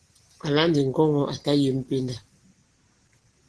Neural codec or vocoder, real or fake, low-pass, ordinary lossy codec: none; real; 10.8 kHz; Opus, 16 kbps